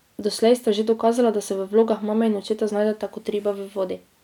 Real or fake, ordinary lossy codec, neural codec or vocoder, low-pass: real; none; none; 19.8 kHz